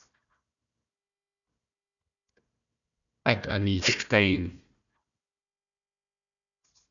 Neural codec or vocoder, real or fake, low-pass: codec, 16 kHz, 1 kbps, FunCodec, trained on Chinese and English, 50 frames a second; fake; 7.2 kHz